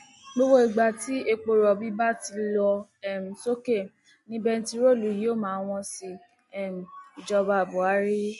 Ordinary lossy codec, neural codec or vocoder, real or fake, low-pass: MP3, 48 kbps; none; real; 14.4 kHz